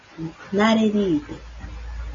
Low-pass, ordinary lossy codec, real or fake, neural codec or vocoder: 7.2 kHz; MP3, 32 kbps; real; none